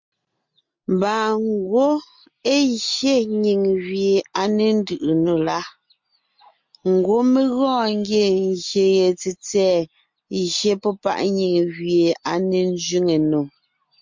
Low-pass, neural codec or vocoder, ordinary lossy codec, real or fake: 7.2 kHz; none; MP3, 48 kbps; real